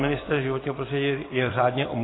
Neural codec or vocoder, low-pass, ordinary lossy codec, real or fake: vocoder, 44.1 kHz, 128 mel bands every 256 samples, BigVGAN v2; 7.2 kHz; AAC, 16 kbps; fake